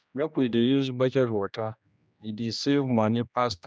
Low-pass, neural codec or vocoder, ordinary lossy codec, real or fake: none; codec, 16 kHz, 1 kbps, X-Codec, HuBERT features, trained on general audio; none; fake